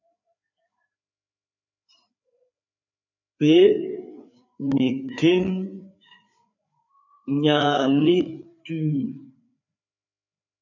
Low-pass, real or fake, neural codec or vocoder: 7.2 kHz; fake; codec, 16 kHz, 4 kbps, FreqCodec, larger model